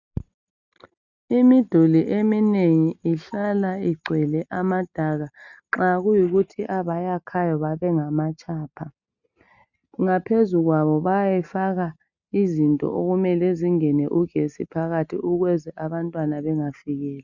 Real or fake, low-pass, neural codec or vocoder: real; 7.2 kHz; none